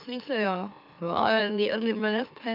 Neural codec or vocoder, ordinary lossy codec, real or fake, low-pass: autoencoder, 44.1 kHz, a latent of 192 numbers a frame, MeloTTS; none; fake; 5.4 kHz